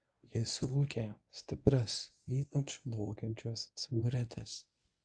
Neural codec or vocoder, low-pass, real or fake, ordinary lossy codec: codec, 24 kHz, 0.9 kbps, WavTokenizer, medium speech release version 1; 9.9 kHz; fake; AAC, 64 kbps